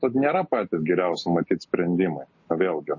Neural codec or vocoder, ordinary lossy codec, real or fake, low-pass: none; MP3, 32 kbps; real; 7.2 kHz